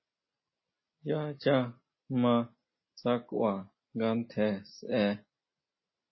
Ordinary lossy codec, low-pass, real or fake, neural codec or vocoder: MP3, 24 kbps; 7.2 kHz; real; none